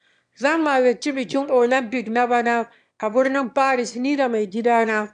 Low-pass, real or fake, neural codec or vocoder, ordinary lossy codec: 9.9 kHz; fake; autoencoder, 22.05 kHz, a latent of 192 numbers a frame, VITS, trained on one speaker; AAC, 96 kbps